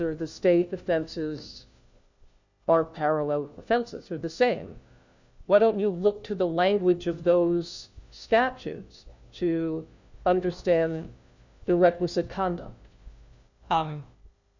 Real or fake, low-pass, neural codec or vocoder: fake; 7.2 kHz; codec, 16 kHz, 1 kbps, FunCodec, trained on LibriTTS, 50 frames a second